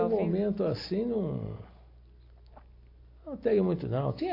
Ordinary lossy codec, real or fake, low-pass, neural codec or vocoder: AAC, 32 kbps; real; 5.4 kHz; none